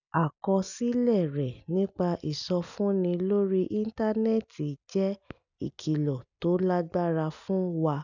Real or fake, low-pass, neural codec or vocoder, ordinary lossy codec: real; 7.2 kHz; none; none